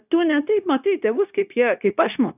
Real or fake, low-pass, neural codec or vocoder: fake; 3.6 kHz; codec, 16 kHz, 0.9 kbps, LongCat-Audio-Codec